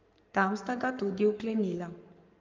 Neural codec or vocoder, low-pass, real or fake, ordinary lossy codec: codec, 44.1 kHz, 7.8 kbps, Pupu-Codec; 7.2 kHz; fake; Opus, 32 kbps